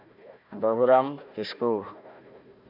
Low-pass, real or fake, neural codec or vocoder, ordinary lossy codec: 5.4 kHz; fake; codec, 16 kHz, 1 kbps, FunCodec, trained on Chinese and English, 50 frames a second; MP3, 48 kbps